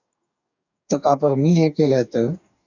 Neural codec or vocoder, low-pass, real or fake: codec, 44.1 kHz, 2.6 kbps, DAC; 7.2 kHz; fake